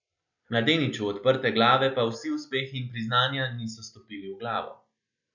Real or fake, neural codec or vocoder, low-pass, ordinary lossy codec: real; none; 7.2 kHz; none